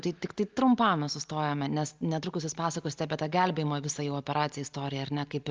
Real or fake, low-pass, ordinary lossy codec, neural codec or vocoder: real; 7.2 kHz; Opus, 32 kbps; none